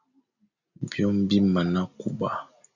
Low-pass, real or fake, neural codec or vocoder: 7.2 kHz; real; none